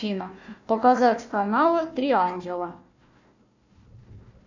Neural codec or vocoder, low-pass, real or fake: codec, 16 kHz, 1 kbps, FunCodec, trained on Chinese and English, 50 frames a second; 7.2 kHz; fake